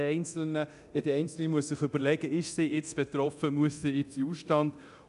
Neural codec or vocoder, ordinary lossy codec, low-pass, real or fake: codec, 24 kHz, 0.9 kbps, DualCodec; none; 10.8 kHz; fake